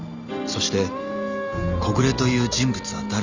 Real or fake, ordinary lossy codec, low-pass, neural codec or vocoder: real; Opus, 64 kbps; 7.2 kHz; none